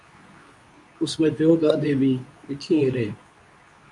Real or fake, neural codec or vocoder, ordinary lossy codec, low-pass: fake; codec, 24 kHz, 0.9 kbps, WavTokenizer, medium speech release version 2; AAC, 64 kbps; 10.8 kHz